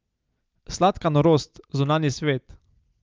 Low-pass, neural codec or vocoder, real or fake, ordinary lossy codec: 7.2 kHz; none; real; Opus, 24 kbps